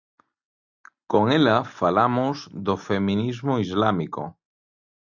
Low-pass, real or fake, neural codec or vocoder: 7.2 kHz; real; none